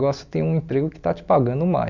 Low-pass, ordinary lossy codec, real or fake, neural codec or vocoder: 7.2 kHz; none; real; none